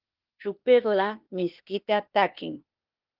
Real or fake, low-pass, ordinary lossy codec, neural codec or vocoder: fake; 5.4 kHz; Opus, 24 kbps; codec, 16 kHz, 0.8 kbps, ZipCodec